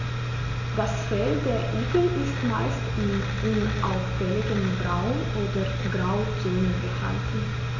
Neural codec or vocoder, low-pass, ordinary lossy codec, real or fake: none; 7.2 kHz; MP3, 48 kbps; real